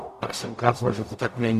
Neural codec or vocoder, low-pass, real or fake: codec, 44.1 kHz, 0.9 kbps, DAC; 14.4 kHz; fake